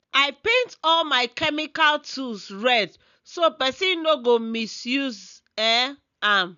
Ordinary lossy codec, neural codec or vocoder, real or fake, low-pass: none; none; real; 7.2 kHz